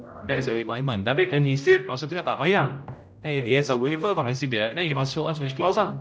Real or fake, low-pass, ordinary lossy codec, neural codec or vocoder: fake; none; none; codec, 16 kHz, 0.5 kbps, X-Codec, HuBERT features, trained on general audio